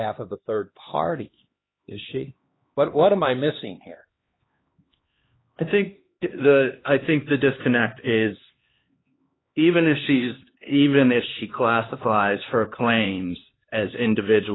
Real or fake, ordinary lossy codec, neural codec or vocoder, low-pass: fake; AAC, 16 kbps; codec, 16 kHz, 2 kbps, X-Codec, HuBERT features, trained on LibriSpeech; 7.2 kHz